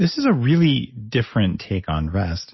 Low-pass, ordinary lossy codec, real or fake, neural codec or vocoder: 7.2 kHz; MP3, 24 kbps; real; none